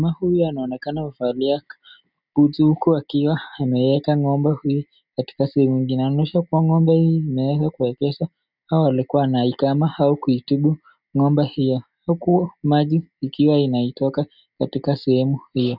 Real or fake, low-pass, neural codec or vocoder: real; 5.4 kHz; none